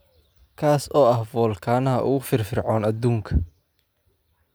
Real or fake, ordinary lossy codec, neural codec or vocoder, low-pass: real; none; none; none